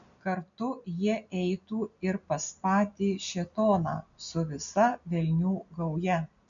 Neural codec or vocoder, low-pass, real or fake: none; 7.2 kHz; real